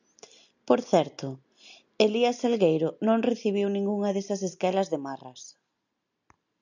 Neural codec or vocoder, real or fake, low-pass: none; real; 7.2 kHz